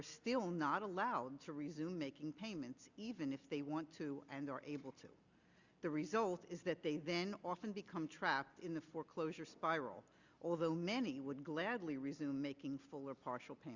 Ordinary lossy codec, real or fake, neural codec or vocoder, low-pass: Opus, 64 kbps; real; none; 7.2 kHz